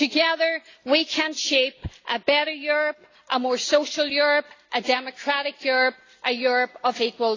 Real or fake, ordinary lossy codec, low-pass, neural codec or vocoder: real; AAC, 32 kbps; 7.2 kHz; none